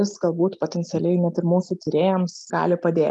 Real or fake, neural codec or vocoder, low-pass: real; none; 10.8 kHz